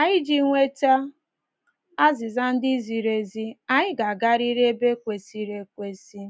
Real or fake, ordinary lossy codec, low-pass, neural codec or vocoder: real; none; none; none